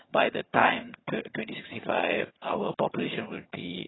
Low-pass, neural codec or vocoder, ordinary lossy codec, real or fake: 7.2 kHz; vocoder, 22.05 kHz, 80 mel bands, HiFi-GAN; AAC, 16 kbps; fake